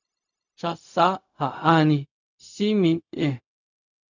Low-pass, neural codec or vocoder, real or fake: 7.2 kHz; codec, 16 kHz, 0.4 kbps, LongCat-Audio-Codec; fake